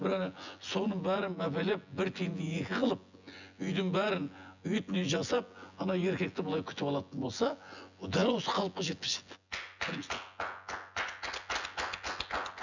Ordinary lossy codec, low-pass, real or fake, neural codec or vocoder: none; 7.2 kHz; fake; vocoder, 24 kHz, 100 mel bands, Vocos